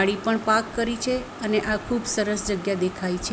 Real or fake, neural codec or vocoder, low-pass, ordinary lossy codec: real; none; none; none